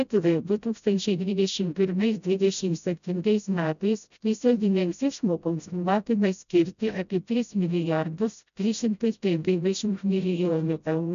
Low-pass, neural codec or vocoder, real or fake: 7.2 kHz; codec, 16 kHz, 0.5 kbps, FreqCodec, smaller model; fake